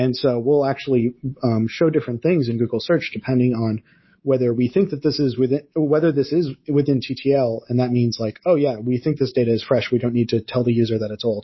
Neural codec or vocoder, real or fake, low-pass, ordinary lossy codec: codec, 24 kHz, 3.1 kbps, DualCodec; fake; 7.2 kHz; MP3, 24 kbps